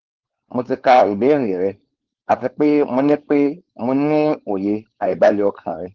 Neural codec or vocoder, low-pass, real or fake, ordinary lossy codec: codec, 16 kHz, 4.8 kbps, FACodec; 7.2 kHz; fake; Opus, 16 kbps